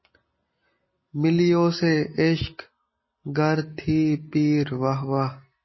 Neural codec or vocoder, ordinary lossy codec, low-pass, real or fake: none; MP3, 24 kbps; 7.2 kHz; real